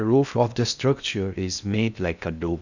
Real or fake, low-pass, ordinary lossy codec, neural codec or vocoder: fake; 7.2 kHz; none; codec, 16 kHz in and 24 kHz out, 0.6 kbps, FocalCodec, streaming, 2048 codes